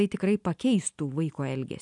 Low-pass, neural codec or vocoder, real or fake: 10.8 kHz; none; real